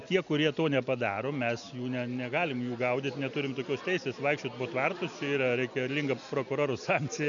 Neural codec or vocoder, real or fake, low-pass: none; real; 7.2 kHz